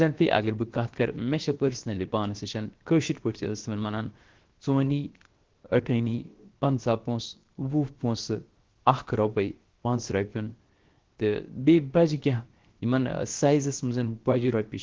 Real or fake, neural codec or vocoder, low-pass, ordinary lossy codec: fake; codec, 16 kHz, 0.7 kbps, FocalCodec; 7.2 kHz; Opus, 16 kbps